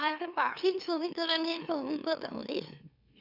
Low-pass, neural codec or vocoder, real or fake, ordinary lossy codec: 5.4 kHz; autoencoder, 44.1 kHz, a latent of 192 numbers a frame, MeloTTS; fake; none